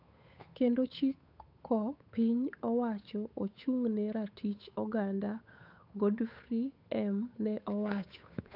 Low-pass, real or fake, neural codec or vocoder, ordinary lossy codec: 5.4 kHz; fake; codec, 16 kHz, 8 kbps, FunCodec, trained on Chinese and English, 25 frames a second; none